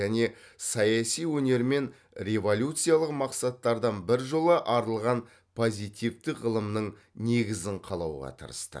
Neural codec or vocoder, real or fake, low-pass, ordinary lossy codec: none; real; none; none